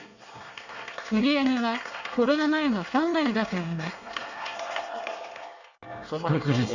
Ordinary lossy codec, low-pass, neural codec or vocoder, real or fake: none; 7.2 kHz; codec, 24 kHz, 1 kbps, SNAC; fake